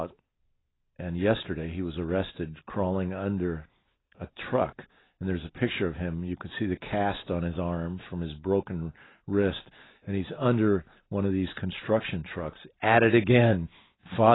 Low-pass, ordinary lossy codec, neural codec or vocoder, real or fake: 7.2 kHz; AAC, 16 kbps; none; real